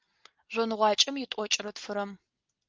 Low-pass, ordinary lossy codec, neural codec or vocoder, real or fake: 7.2 kHz; Opus, 24 kbps; none; real